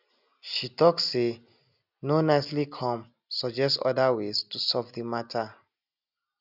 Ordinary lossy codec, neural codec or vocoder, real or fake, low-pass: none; none; real; 5.4 kHz